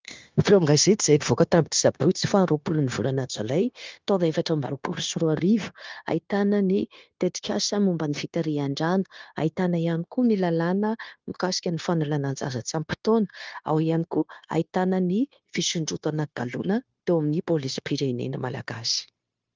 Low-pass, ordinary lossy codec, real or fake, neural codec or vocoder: 7.2 kHz; Opus, 32 kbps; fake; codec, 16 kHz, 0.9 kbps, LongCat-Audio-Codec